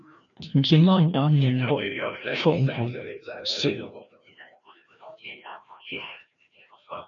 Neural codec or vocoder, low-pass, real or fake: codec, 16 kHz, 1 kbps, FreqCodec, larger model; 7.2 kHz; fake